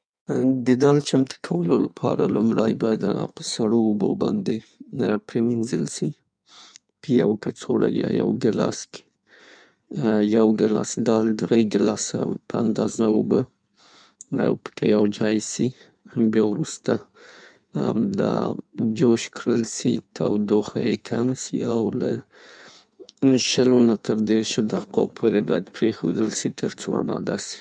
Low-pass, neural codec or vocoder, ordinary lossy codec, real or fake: 9.9 kHz; codec, 16 kHz in and 24 kHz out, 1.1 kbps, FireRedTTS-2 codec; none; fake